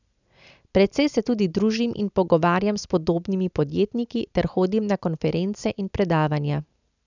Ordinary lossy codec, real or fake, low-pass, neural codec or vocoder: none; real; 7.2 kHz; none